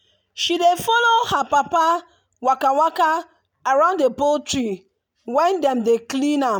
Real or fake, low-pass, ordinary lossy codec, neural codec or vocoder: real; none; none; none